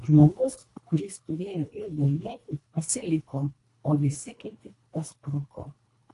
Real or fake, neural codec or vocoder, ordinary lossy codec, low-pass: fake; codec, 24 kHz, 1.5 kbps, HILCodec; AAC, 48 kbps; 10.8 kHz